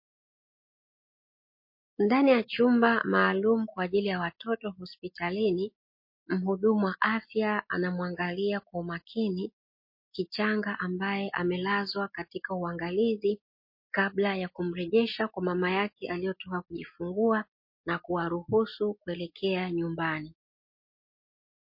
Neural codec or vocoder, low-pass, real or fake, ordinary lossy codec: none; 5.4 kHz; real; MP3, 32 kbps